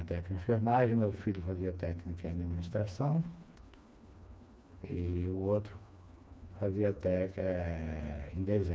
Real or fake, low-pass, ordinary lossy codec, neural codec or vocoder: fake; none; none; codec, 16 kHz, 2 kbps, FreqCodec, smaller model